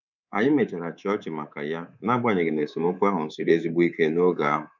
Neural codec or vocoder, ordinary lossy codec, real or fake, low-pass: codec, 24 kHz, 3.1 kbps, DualCodec; none; fake; 7.2 kHz